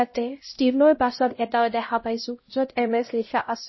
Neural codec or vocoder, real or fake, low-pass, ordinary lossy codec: codec, 16 kHz, 0.5 kbps, X-Codec, HuBERT features, trained on LibriSpeech; fake; 7.2 kHz; MP3, 24 kbps